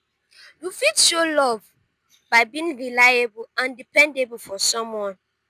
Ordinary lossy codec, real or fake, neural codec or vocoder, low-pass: none; real; none; 14.4 kHz